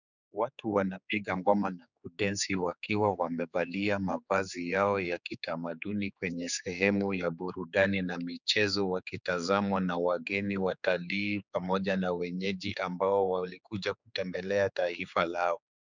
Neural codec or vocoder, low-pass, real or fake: codec, 16 kHz, 4 kbps, X-Codec, HuBERT features, trained on general audio; 7.2 kHz; fake